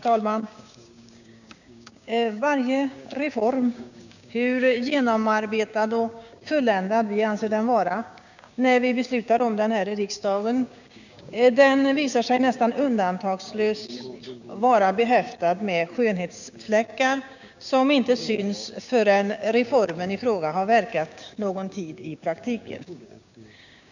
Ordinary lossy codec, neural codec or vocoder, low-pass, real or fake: none; codec, 16 kHz, 6 kbps, DAC; 7.2 kHz; fake